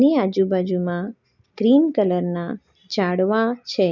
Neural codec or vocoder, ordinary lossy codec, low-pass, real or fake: none; none; 7.2 kHz; real